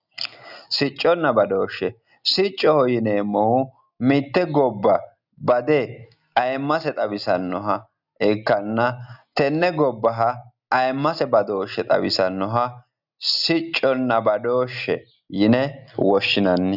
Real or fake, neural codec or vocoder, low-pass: real; none; 5.4 kHz